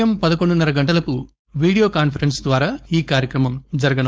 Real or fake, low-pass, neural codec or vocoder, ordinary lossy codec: fake; none; codec, 16 kHz, 4.8 kbps, FACodec; none